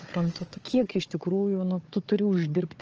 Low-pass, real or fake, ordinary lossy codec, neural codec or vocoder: 7.2 kHz; fake; Opus, 24 kbps; codec, 16 kHz, 4 kbps, FunCodec, trained on Chinese and English, 50 frames a second